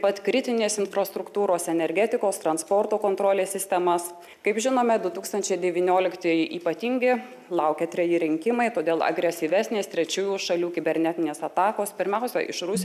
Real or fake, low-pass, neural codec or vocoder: real; 14.4 kHz; none